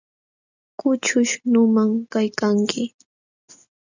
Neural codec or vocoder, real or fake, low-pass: none; real; 7.2 kHz